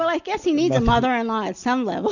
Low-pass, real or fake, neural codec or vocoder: 7.2 kHz; real; none